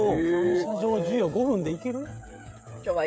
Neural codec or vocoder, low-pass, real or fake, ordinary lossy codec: codec, 16 kHz, 16 kbps, FreqCodec, smaller model; none; fake; none